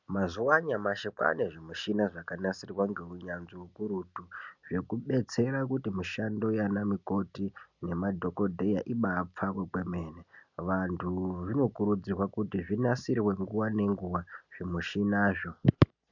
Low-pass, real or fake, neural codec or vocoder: 7.2 kHz; real; none